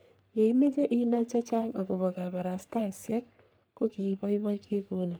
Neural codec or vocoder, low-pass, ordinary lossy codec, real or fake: codec, 44.1 kHz, 3.4 kbps, Pupu-Codec; none; none; fake